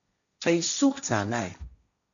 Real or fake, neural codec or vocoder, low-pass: fake; codec, 16 kHz, 1.1 kbps, Voila-Tokenizer; 7.2 kHz